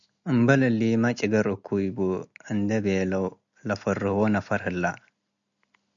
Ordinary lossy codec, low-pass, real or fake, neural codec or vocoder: MP3, 96 kbps; 7.2 kHz; real; none